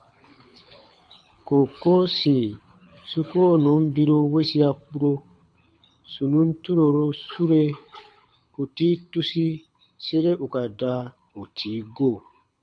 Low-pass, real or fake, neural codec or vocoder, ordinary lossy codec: 9.9 kHz; fake; codec, 24 kHz, 6 kbps, HILCodec; MP3, 64 kbps